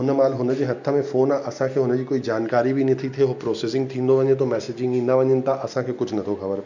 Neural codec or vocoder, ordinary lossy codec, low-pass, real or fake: none; none; 7.2 kHz; real